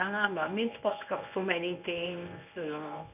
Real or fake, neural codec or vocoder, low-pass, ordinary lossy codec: fake; codec, 24 kHz, 0.9 kbps, WavTokenizer, medium speech release version 1; 3.6 kHz; none